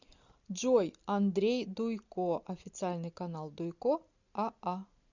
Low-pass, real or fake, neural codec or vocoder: 7.2 kHz; real; none